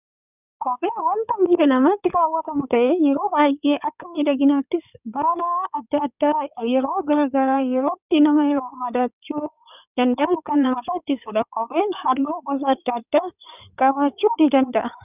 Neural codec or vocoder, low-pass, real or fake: codec, 16 kHz in and 24 kHz out, 2.2 kbps, FireRedTTS-2 codec; 3.6 kHz; fake